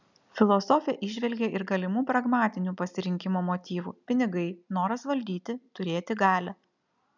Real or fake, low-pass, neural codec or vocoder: real; 7.2 kHz; none